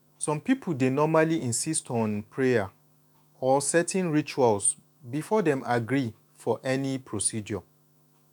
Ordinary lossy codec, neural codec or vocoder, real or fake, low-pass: none; autoencoder, 48 kHz, 128 numbers a frame, DAC-VAE, trained on Japanese speech; fake; 19.8 kHz